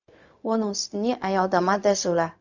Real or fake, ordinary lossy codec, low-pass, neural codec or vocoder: fake; none; 7.2 kHz; codec, 16 kHz, 0.4 kbps, LongCat-Audio-Codec